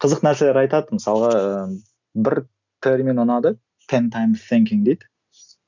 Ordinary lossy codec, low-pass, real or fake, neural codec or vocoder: none; 7.2 kHz; real; none